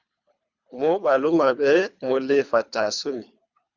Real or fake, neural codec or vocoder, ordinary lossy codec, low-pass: fake; codec, 24 kHz, 3 kbps, HILCodec; Opus, 64 kbps; 7.2 kHz